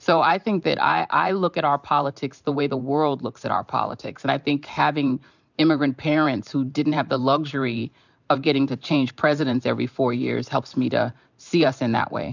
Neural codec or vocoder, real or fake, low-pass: vocoder, 44.1 kHz, 128 mel bands every 512 samples, BigVGAN v2; fake; 7.2 kHz